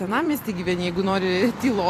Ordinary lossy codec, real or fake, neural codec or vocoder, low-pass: AAC, 48 kbps; fake; vocoder, 44.1 kHz, 128 mel bands every 256 samples, BigVGAN v2; 14.4 kHz